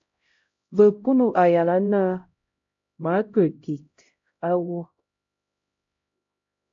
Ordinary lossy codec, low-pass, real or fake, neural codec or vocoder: AAC, 64 kbps; 7.2 kHz; fake; codec, 16 kHz, 0.5 kbps, X-Codec, HuBERT features, trained on LibriSpeech